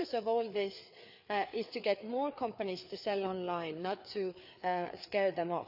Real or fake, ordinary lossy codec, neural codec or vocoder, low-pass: fake; none; codec, 16 kHz, 4 kbps, FreqCodec, larger model; 5.4 kHz